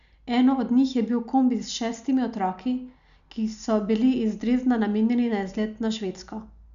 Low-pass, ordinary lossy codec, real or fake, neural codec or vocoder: 7.2 kHz; none; real; none